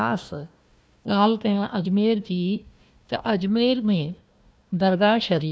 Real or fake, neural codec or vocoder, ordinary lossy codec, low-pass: fake; codec, 16 kHz, 1 kbps, FunCodec, trained on Chinese and English, 50 frames a second; none; none